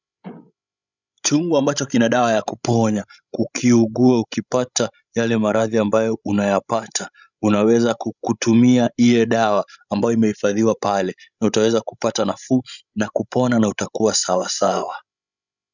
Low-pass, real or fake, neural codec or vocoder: 7.2 kHz; fake; codec, 16 kHz, 16 kbps, FreqCodec, larger model